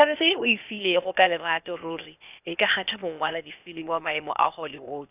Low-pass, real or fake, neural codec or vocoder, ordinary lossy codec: 3.6 kHz; fake; codec, 16 kHz, 0.8 kbps, ZipCodec; none